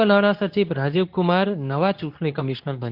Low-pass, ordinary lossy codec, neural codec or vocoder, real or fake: 5.4 kHz; Opus, 32 kbps; codec, 24 kHz, 0.9 kbps, WavTokenizer, medium speech release version 2; fake